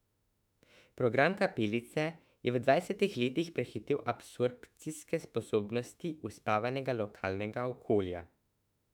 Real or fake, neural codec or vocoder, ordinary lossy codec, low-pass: fake; autoencoder, 48 kHz, 32 numbers a frame, DAC-VAE, trained on Japanese speech; none; 19.8 kHz